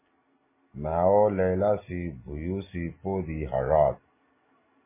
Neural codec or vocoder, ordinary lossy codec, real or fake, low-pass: none; MP3, 16 kbps; real; 3.6 kHz